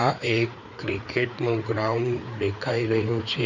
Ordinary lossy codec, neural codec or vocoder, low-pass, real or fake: none; codec, 16 kHz, 4 kbps, FreqCodec, larger model; 7.2 kHz; fake